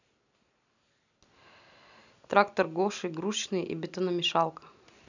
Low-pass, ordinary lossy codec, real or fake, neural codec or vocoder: 7.2 kHz; none; real; none